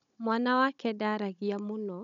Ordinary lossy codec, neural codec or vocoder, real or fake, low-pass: none; codec, 16 kHz, 16 kbps, FunCodec, trained on Chinese and English, 50 frames a second; fake; 7.2 kHz